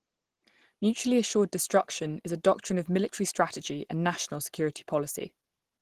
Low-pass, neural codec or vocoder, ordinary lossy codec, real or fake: 14.4 kHz; none; Opus, 16 kbps; real